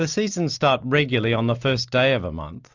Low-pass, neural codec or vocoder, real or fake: 7.2 kHz; none; real